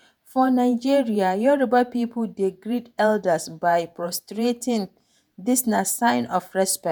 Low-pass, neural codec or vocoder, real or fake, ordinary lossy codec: none; vocoder, 48 kHz, 128 mel bands, Vocos; fake; none